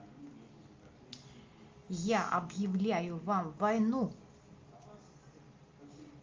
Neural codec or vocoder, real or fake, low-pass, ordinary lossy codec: none; real; 7.2 kHz; Opus, 32 kbps